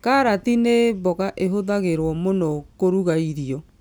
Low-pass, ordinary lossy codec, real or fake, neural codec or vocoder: none; none; real; none